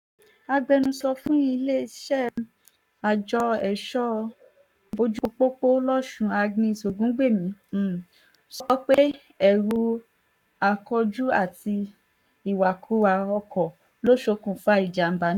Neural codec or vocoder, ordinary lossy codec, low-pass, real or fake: codec, 44.1 kHz, 7.8 kbps, Pupu-Codec; none; 19.8 kHz; fake